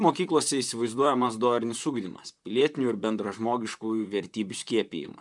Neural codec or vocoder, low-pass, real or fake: vocoder, 44.1 kHz, 128 mel bands, Pupu-Vocoder; 10.8 kHz; fake